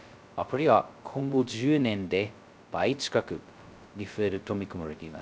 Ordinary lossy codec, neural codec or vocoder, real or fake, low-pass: none; codec, 16 kHz, 0.2 kbps, FocalCodec; fake; none